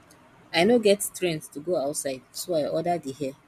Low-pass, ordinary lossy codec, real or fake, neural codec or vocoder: 14.4 kHz; none; real; none